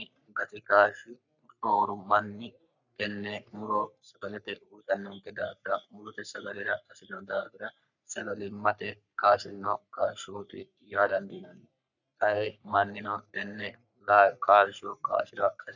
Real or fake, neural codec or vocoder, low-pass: fake; codec, 44.1 kHz, 3.4 kbps, Pupu-Codec; 7.2 kHz